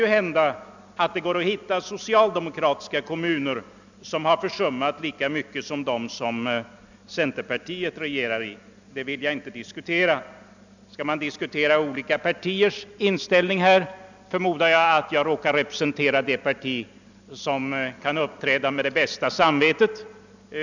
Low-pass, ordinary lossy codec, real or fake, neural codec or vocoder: 7.2 kHz; none; real; none